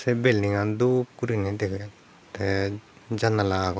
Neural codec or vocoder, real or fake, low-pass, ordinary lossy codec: none; real; none; none